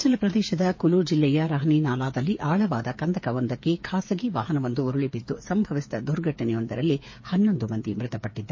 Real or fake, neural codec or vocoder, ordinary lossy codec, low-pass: fake; codec, 16 kHz, 8 kbps, FreqCodec, smaller model; MP3, 32 kbps; 7.2 kHz